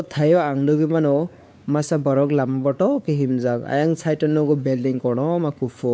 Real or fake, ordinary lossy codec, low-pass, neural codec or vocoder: fake; none; none; codec, 16 kHz, 4 kbps, X-Codec, WavLM features, trained on Multilingual LibriSpeech